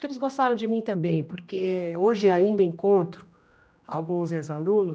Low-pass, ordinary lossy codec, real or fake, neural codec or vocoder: none; none; fake; codec, 16 kHz, 1 kbps, X-Codec, HuBERT features, trained on general audio